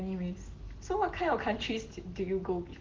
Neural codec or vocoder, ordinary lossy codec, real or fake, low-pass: none; Opus, 16 kbps; real; 7.2 kHz